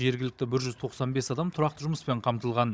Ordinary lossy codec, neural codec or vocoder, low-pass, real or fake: none; codec, 16 kHz, 16 kbps, FunCodec, trained on Chinese and English, 50 frames a second; none; fake